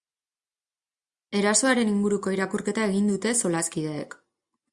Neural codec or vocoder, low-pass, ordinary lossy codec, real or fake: none; 10.8 kHz; Opus, 64 kbps; real